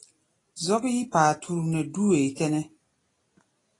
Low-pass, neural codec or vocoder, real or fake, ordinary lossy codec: 10.8 kHz; none; real; AAC, 32 kbps